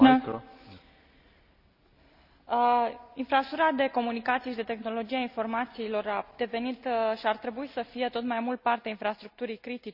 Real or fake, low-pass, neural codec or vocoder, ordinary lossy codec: real; 5.4 kHz; none; none